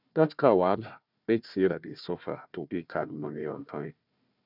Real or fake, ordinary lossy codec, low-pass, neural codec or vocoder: fake; none; 5.4 kHz; codec, 16 kHz, 1 kbps, FunCodec, trained on Chinese and English, 50 frames a second